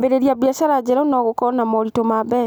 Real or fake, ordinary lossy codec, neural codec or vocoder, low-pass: real; none; none; none